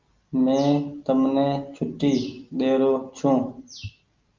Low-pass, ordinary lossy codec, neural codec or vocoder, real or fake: 7.2 kHz; Opus, 24 kbps; none; real